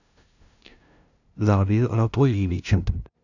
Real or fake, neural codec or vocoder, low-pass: fake; codec, 16 kHz, 0.5 kbps, FunCodec, trained on LibriTTS, 25 frames a second; 7.2 kHz